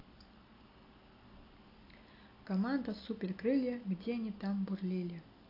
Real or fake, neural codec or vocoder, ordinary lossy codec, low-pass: real; none; AAC, 32 kbps; 5.4 kHz